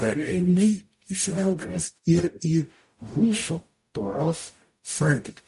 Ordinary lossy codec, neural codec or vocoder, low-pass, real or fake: MP3, 48 kbps; codec, 44.1 kHz, 0.9 kbps, DAC; 14.4 kHz; fake